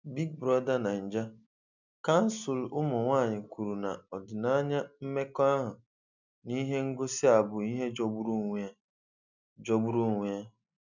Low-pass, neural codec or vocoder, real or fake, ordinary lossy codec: 7.2 kHz; none; real; none